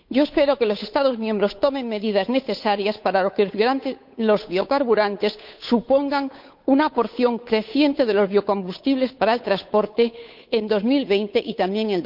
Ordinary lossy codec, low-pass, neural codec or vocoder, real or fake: none; 5.4 kHz; codec, 16 kHz, 8 kbps, FunCodec, trained on Chinese and English, 25 frames a second; fake